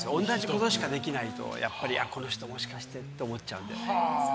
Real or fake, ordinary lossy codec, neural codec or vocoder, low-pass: real; none; none; none